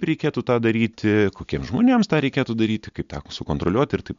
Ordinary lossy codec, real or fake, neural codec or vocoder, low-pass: MP3, 64 kbps; real; none; 7.2 kHz